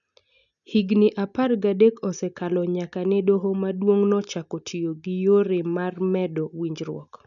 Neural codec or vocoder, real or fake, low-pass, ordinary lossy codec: none; real; 7.2 kHz; none